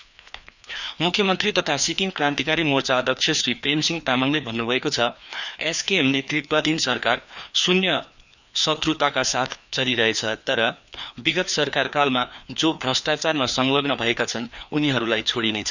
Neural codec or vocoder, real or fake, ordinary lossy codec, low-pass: codec, 16 kHz, 2 kbps, FreqCodec, larger model; fake; none; 7.2 kHz